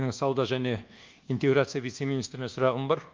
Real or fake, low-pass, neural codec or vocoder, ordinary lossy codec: fake; 7.2 kHz; codec, 24 kHz, 1.2 kbps, DualCodec; Opus, 24 kbps